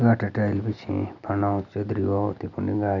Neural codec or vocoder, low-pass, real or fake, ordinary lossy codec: none; 7.2 kHz; real; none